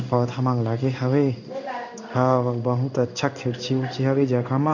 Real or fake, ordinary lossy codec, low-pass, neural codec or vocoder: fake; none; 7.2 kHz; codec, 16 kHz in and 24 kHz out, 1 kbps, XY-Tokenizer